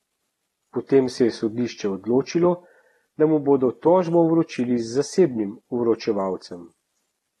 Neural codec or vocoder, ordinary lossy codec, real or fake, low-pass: none; AAC, 32 kbps; real; 19.8 kHz